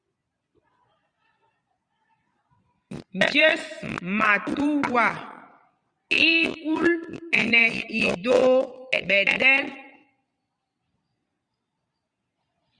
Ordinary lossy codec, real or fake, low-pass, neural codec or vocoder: Opus, 64 kbps; fake; 9.9 kHz; vocoder, 22.05 kHz, 80 mel bands, Vocos